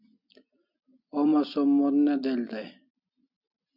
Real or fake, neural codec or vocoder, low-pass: real; none; 5.4 kHz